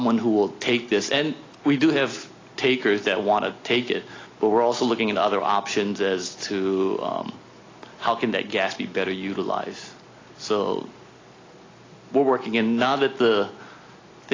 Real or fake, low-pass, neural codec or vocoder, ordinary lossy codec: real; 7.2 kHz; none; AAC, 32 kbps